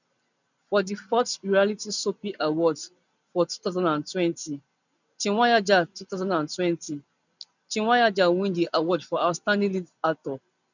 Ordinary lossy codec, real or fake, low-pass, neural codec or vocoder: none; real; 7.2 kHz; none